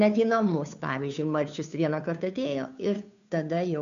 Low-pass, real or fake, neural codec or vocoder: 7.2 kHz; fake; codec, 16 kHz, 2 kbps, FunCodec, trained on Chinese and English, 25 frames a second